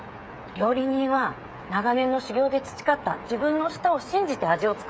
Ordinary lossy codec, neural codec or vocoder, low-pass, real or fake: none; codec, 16 kHz, 8 kbps, FreqCodec, smaller model; none; fake